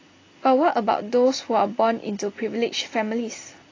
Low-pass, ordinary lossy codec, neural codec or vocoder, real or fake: 7.2 kHz; AAC, 32 kbps; none; real